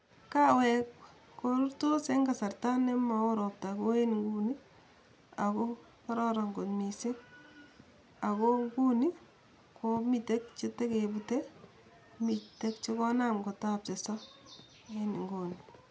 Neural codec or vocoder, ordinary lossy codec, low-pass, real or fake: none; none; none; real